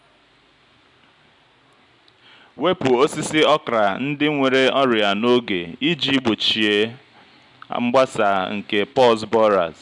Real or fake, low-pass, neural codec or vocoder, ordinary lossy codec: real; 10.8 kHz; none; MP3, 96 kbps